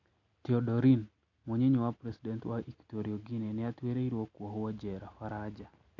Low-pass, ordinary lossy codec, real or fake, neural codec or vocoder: 7.2 kHz; none; real; none